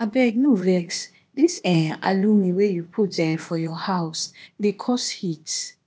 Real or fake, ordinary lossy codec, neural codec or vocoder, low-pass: fake; none; codec, 16 kHz, 0.8 kbps, ZipCodec; none